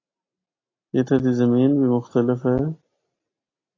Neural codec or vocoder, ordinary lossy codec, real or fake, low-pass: none; AAC, 32 kbps; real; 7.2 kHz